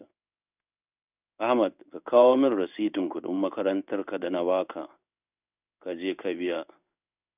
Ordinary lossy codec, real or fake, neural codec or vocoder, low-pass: none; fake; codec, 16 kHz in and 24 kHz out, 1 kbps, XY-Tokenizer; 3.6 kHz